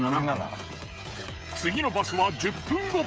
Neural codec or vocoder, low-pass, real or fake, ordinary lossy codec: codec, 16 kHz, 16 kbps, FreqCodec, smaller model; none; fake; none